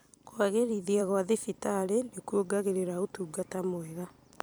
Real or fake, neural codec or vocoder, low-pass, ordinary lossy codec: fake; vocoder, 44.1 kHz, 128 mel bands every 256 samples, BigVGAN v2; none; none